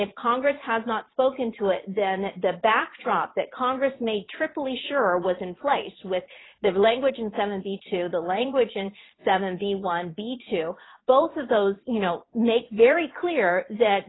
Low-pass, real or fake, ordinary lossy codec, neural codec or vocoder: 7.2 kHz; fake; AAC, 16 kbps; vocoder, 22.05 kHz, 80 mel bands, Vocos